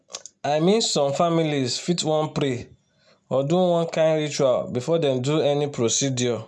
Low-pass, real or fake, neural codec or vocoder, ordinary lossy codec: none; real; none; none